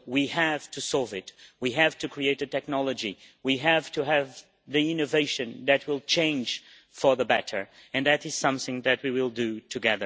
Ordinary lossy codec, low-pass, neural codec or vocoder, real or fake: none; none; none; real